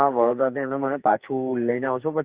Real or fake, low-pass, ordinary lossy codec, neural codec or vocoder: fake; 3.6 kHz; Opus, 64 kbps; codec, 44.1 kHz, 2.6 kbps, SNAC